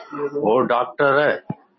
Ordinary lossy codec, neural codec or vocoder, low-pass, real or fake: MP3, 24 kbps; none; 7.2 kHz; real